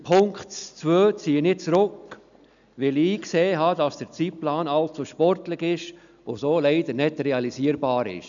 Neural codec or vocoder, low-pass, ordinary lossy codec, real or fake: none; 7.2 kHz; none; real